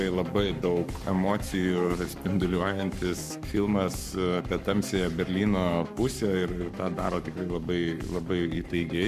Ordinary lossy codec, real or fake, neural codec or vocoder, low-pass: MP3, 96 kbps; fake; codec, 44.1 kHz, 7.8 kbps, Pupu-Codec; 14.4 kHz